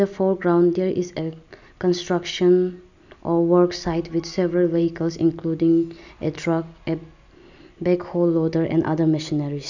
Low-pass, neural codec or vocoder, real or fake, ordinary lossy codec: 7.2 kHz; none; real; none